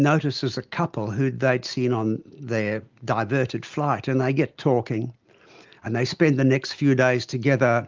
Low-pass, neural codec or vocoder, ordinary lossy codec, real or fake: 7.2 kHz; none; Opus, 24 kbps; real